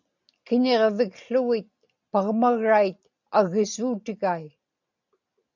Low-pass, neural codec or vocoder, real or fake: 7.2 kHz; none; real